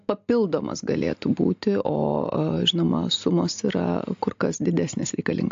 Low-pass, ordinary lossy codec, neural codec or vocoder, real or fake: 7.2 kHz; AAC, 48 kbps; none; real